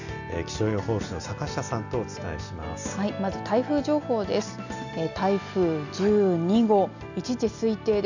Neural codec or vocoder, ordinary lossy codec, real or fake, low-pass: none; none; real; 7.2 kHz